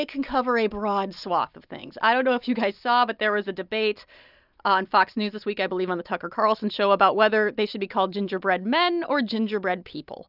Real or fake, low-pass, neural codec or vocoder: real; 5.4 kHz; none